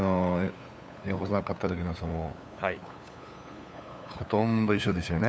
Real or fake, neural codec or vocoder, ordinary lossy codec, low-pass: fake; codec, 16 kHz, 8 kbps, FunCodec, trained on LibriTTS, 25 frames a second; none; none